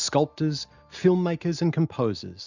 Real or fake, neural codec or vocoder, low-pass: real; none; 7.2 kHz